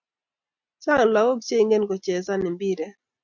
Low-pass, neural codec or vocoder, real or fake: 7.2 kHz; none; real